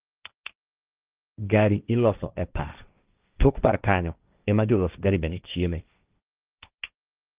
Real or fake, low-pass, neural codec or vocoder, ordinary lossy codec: fake; 3.6 kHz; codec, 16 kHz, 1.1 kbps, Voila-Tokenizer; Opus, 32 kbps